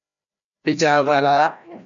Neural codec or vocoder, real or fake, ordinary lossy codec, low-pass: codec, 16 kHz, 1 kbps, FreqCodec, larger model; fake; MP3, 96 kbps; 7.2 kHz